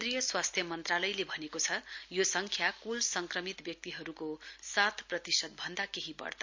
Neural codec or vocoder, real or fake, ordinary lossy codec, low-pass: none; real; MP3, 64 kbps; 7.2 kHz